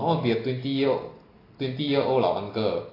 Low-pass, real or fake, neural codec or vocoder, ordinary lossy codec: 5.4 kHz; real; none; AAC, 24 kbps